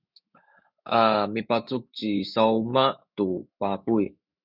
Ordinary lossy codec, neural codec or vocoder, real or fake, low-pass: Opus, 64 kbps; autoencoder, 48 kHz, 128 numbers a frame, DAC-VAE, trained on Japanese speech; fake; 5.4 kHz